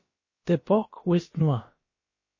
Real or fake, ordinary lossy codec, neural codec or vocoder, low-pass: fake; MP3, 32 kbps; codec, 16 kHz, about 1 kbps, DyCAST, with the encoder's durations; 7.2 kHz